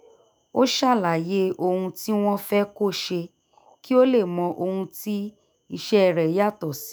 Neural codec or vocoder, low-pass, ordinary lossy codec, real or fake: autoencoder, 48 kHz, 128 numbers a frame, DAC-VAE, trained on Japanese speech; none; none; fake